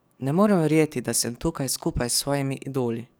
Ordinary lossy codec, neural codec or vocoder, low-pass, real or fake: none; codec, 44.1 kHz, 7.8 kbps, DAC; none; fake